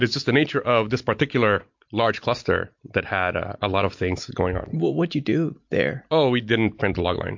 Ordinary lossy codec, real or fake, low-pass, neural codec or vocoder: MP3, 48 kbps; real; 7.2 kHz; none